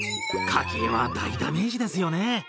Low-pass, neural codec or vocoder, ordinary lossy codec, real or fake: none; none; none; real